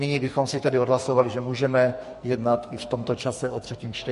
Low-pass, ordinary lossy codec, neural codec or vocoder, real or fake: 14.4 kHz; MP3, 48 kbps; codec, 44.1 kHz, 2.6 kbps, SNAC; fake